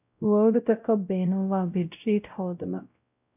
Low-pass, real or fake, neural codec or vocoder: 3.6 kHz; fake; codec, 16 kHz, 0.5 kbps, X-Codec, WavLM features, trained on Multilingual LibriSpeech